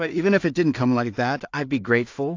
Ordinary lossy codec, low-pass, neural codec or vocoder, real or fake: AAC, 48 kbps; 7.2 kHz; codec, 16 kHz in and 24 kHz out, 0.4 kbps, LongCat-Audio-Codec, two codebook decoder; fake